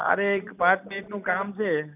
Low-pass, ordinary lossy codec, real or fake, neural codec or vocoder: 3.6 kHz; none; real; none